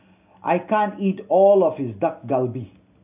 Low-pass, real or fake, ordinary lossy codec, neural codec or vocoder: 3.6 kHz; real; AAC, 32 kbps; none